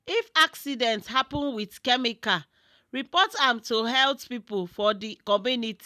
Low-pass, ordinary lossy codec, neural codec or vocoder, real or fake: 14.4 kHz; none; none; real